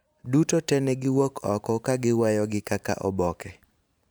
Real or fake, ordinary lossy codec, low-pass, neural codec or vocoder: fake; none; none; vocoder, 44.1 kHz, 128 mel bands every 512 samples, BigVGAN v2